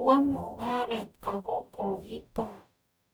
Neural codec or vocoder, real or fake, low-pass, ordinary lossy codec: codec, 44.1 kHz, 0.9 kbps, DAC; fake; none; none